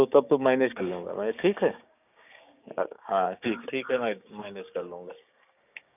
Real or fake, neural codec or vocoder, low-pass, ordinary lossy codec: fake; codec, 16 kHz, 6 kbps, DAC; 3.6 kHz; none